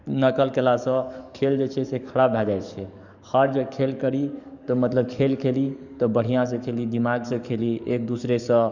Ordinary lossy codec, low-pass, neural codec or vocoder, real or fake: none; 7.2 kHz; codec, 44.1 kHz, 7.8 kbps, DAC; fake